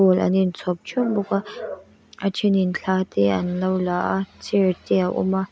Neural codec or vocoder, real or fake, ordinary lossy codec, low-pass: none; real; none; none